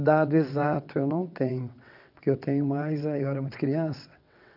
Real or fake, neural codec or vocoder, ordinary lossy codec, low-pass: fake; vocoder, 22.05 kHz, 80 mel bands, WaveNeXt; none; 5.4 kHz